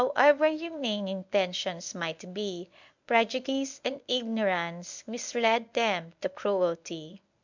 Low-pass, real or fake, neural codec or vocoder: 7.2 kHz; fake; codec, 24 kHz, 0.9 kbps, WavTokenizer, medium speech release version 1